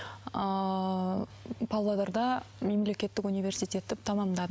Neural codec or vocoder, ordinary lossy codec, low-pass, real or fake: none; none; none; real